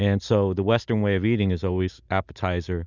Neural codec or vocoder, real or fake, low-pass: none; real; 7.2 kHz